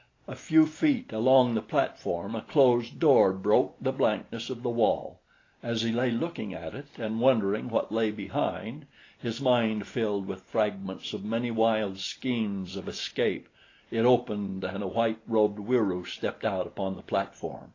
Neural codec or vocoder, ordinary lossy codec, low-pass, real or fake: none; AAC, 32 kbps; 7.2 kHz; real